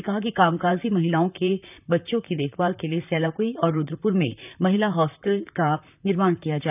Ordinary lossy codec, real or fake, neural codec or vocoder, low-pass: none; fake; vocoder, 44.1 kHz, 128 mel bands, Pupu-Vocoder; 3.6 kHz